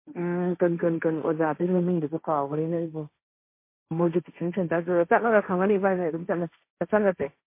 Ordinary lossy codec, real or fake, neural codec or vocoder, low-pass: MP3, 24 kbps; fake; codec, 16 kHz, 1.1 kbps, Voila-Tokenizer; 3.6 kHz